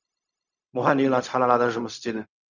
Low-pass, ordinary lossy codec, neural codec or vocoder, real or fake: 7.2 kHz; none; codec, 16 kHz, 0.4 kbps, LongCat-Audio-Codec; fake